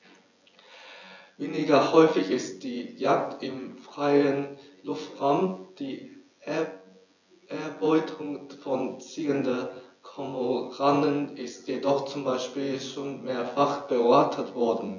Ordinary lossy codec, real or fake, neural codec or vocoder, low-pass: none; fake; vocoder, 24 kHz, 100 mel bands, Vocos; 7.2 kHz